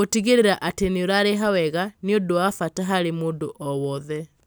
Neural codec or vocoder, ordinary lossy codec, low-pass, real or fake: none; none; none; real